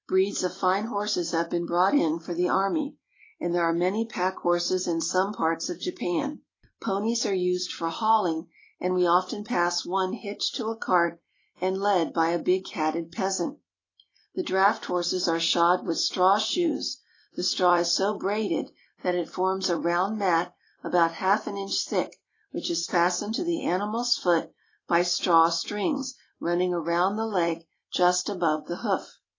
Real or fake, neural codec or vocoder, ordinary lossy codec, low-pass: real; none; AAC, 32 kbps; 7.2 kHz